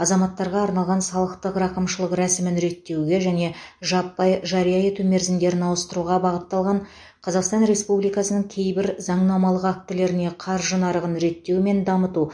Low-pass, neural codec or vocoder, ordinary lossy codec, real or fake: 9.9 kHz; none; MP3, 48 kbps; real